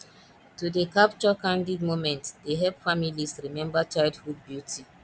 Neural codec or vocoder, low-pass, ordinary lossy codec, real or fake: none; none; none; real